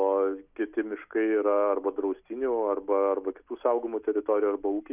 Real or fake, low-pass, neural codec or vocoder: real; 3.6 kHz; none